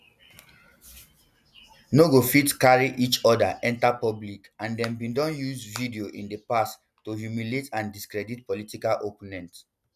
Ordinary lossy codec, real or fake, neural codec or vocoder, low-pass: none; real; none; 14.4 kHz